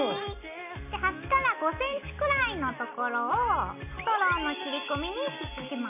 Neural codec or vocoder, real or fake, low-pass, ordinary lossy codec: none; real; 3.6 kHz; none